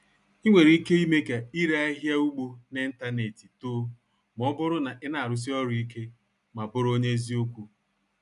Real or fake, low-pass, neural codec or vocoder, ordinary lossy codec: real; 10.8 kHz; none; none